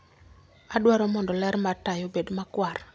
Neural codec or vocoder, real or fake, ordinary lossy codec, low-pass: none; real; none; none